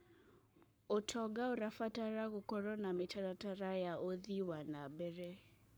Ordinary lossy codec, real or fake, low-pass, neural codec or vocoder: none; fake; none; codec, 44.1 kHz, 7.8 kbps, Pupu-Codec